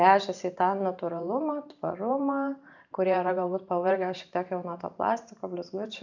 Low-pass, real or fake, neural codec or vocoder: 7.2 kHz; fake; vocoder, 44.1 kHz, 128 mel bands every 512 samples, BigVGAN v2